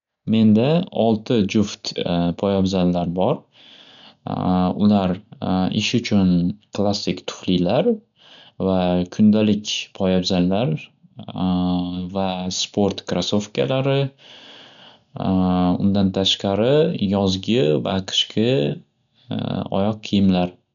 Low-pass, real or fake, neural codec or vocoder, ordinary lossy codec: 7.2 kHz; real; none; none